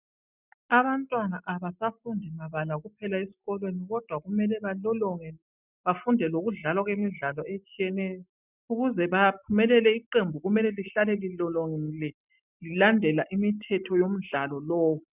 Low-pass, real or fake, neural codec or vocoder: 3.6 kHz; real; none